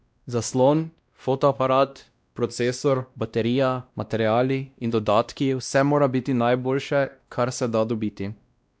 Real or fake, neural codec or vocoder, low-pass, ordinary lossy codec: fake; codec, 16 kHz, 1 kbps, X-Codec, WavLM features, trained on Multilingual LibriSpeech; none; none